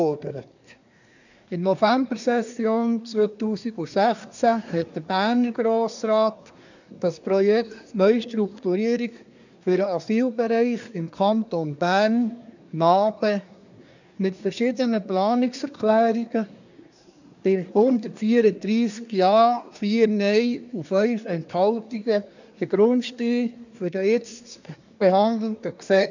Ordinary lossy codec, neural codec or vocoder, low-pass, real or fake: none; codec, 24 kHz, 1 kbps, SNAC; 7.2 kHz; fake